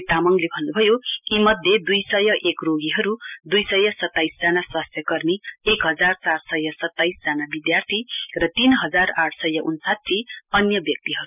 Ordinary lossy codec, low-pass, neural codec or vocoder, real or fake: none; 3.6 kHz; none; real